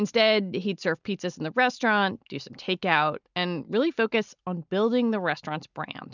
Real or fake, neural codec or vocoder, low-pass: real; none; 7.2 kHz